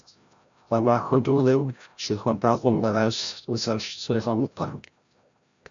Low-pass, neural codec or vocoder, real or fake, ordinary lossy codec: 7.2 kHz; codec, 16 kHz, 0.5 kbps, FreqCodec, larger model; fake; AAC, 64 kbps